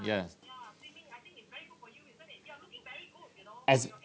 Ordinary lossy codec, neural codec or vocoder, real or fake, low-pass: none; none; real; none